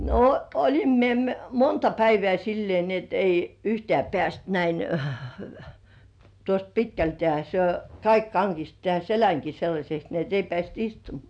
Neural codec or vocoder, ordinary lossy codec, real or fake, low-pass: none; none; real; 9.9 kHz